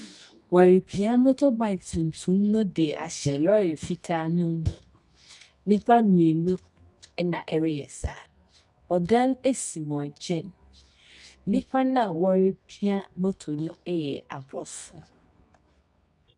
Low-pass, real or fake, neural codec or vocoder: 10.8 kHz; fake; codec, 24 kHz, 0.9 kbps, WavTokenizer, medium music audio release